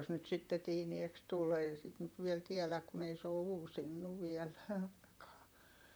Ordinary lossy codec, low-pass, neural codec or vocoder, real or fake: none; none; vocoder, 44.1 kHz, 128 mel bands, Pupu-Vocoder; fake